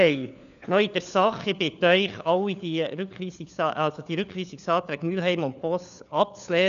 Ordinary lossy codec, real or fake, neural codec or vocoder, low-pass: none; fake; codec, 16 kHz, 4 kbps, FunCodec, trained on LibriTTS, 50 frames a second; 7.2 kHz